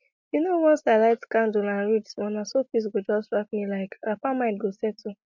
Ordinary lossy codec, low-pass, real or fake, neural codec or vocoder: MP3, 48 kbps; 7.2 kHz; real; none